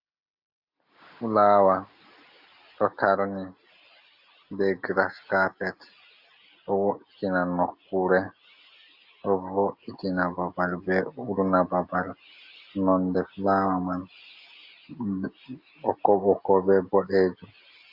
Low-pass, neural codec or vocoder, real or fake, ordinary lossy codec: 5.4 kHz; none; real; AAC, 48 kbps